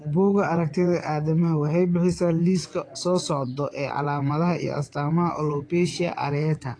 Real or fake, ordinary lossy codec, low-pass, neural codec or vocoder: fake; AAC, 48 kbps; 9.9 kHz; vocoder, 22.05 kHz, 80 mel bands, WaveNeXt